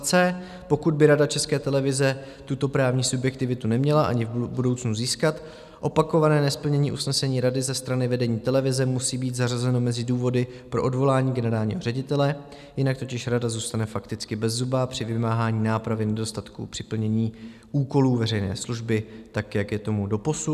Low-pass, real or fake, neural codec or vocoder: 14.4 kHz; real; none